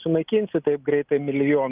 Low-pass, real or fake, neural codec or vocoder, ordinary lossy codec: 3.6 kHz; real; none; Opus, 32 kbps